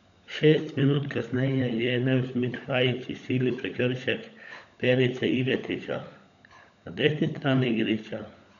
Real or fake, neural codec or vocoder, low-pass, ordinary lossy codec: fake; codec, 16 kHz, 16 kbps, FunCodec, trained on LibriTTS, 50 frames a second; 7.2 kHz; none